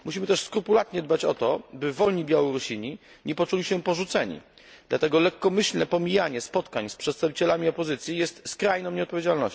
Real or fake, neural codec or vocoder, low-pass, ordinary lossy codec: real; none; none; none